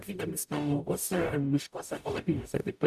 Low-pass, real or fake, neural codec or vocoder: 14.4 kHz; fake; codec, 44.1 kHz, 0.9 kbps, DAC